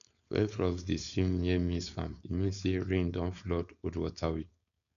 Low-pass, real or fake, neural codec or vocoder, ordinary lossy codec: 7.2 kHz; fake; codec, 16 kHz, 4.8 kbps, FACodec; none